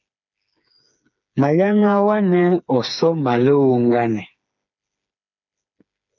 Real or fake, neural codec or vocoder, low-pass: fake; codec, 16 kHz, 4 kbps, FreqCodec, smaller model; 7.2 kHz